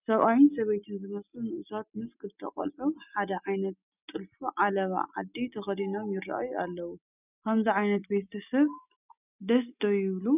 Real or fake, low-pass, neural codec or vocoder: real; 3.6 kHz; none